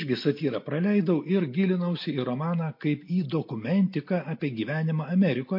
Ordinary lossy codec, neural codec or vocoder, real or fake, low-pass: MP3, 32 kbps; none; real; 5.4 kHz